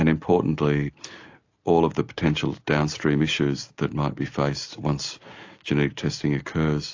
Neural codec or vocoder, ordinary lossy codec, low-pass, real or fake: none; AAC, 48 kbps; 7.2 kHz; real